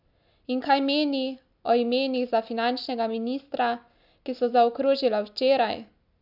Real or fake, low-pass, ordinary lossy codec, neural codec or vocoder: real; 5.4 kHz; AAC, 48 kbps; none